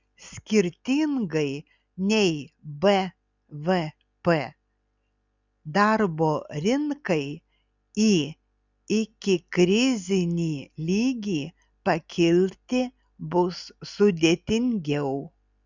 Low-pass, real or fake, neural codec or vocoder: 7.2 kHz; real; none